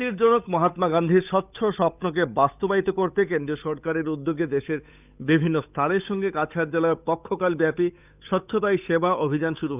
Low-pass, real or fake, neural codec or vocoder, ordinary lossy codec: 3.6 kHz; fake; codec, 16 kHz, 8 kbps, FunCodec, trained on Chinese and English, 25 frames a second; none